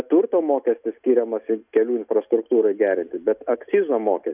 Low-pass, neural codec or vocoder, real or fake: 3.6 kHz; none; real